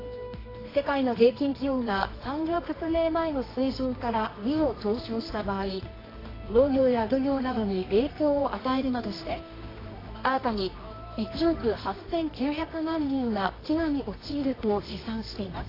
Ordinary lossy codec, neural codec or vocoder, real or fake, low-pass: AAC, 24 kbps; codec, 24 kHz, 0.9 kbps, WavTokenizer, medium music audio release; fake; 5.4 kHz